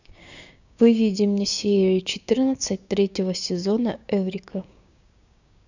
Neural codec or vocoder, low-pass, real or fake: codec, 16 kHz, 6 kbps, DAC; 7.2 kHz; fake